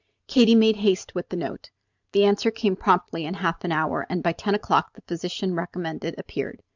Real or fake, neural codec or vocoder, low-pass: fake; vocoder, 44.1 kHz, 128 mel bands every 512 samples, BigVGAN v2; 7.2 kHz